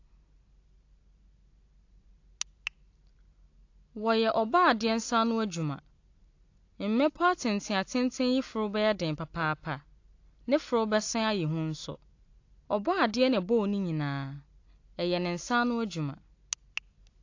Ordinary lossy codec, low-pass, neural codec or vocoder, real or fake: AAC, 48 kbps; 7.2 kHz; none; real